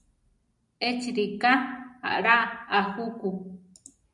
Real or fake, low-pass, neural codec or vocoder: real; 10.8 kHz; none